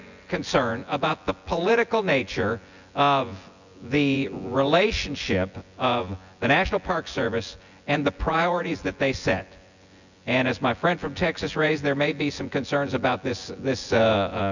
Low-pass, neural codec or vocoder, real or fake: 7.2 kHz; vocoder, 24 kHz, 100 mel bands, Vocos; fake